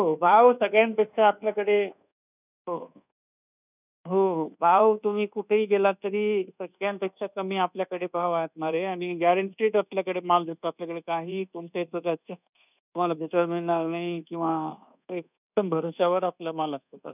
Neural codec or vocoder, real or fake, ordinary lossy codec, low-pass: codec, 24 kHz, 1.2 kbps, DualCodec; fake; none; 3.6 kHz